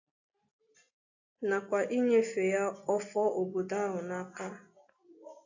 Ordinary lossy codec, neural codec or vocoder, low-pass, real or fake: AAC, 48 kbps; none; 7.2 kHz; real